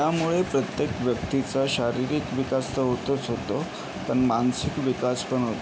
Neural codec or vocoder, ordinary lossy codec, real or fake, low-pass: none; none; real; none